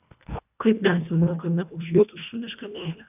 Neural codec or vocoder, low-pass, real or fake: codec, 24 kHz, 1.5 kbps, HILCodec; 3.6 kHz; fake